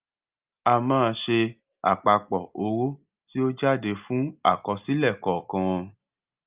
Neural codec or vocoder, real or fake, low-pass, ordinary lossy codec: none; real; 3.6 kHz; Opus, 24 kbps